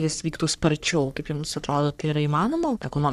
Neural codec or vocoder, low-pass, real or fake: codec, 44.1 kHz, 3.4 kbps, Pupu-Codec; 14.4 kHz; fake